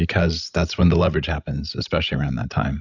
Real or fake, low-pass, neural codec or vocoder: fake; 7.2 kHz; codec, 16 kHz, 16 kbps, FreqCodec, larger model